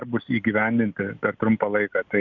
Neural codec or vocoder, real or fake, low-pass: none; real; 7.2 kHz